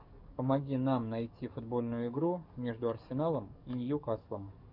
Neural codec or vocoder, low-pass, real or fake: codec, 44.1 kHz, 7.8 kbps, DAC; 5.4 kHz; fake